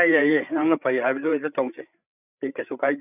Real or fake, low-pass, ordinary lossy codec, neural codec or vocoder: fake; 3.6 kHz; none; codec, 16 kHz, 8 kbps, FreqCodec, larger model